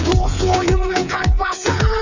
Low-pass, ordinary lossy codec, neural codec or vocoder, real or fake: 7.2 kHz; none; codec, 16 kHz, 4 kbps, FreqCodec, smaller model; fake